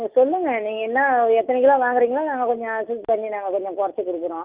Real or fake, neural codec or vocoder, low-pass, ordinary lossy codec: real; none; 3.6 kHz; Opus, 24 kbps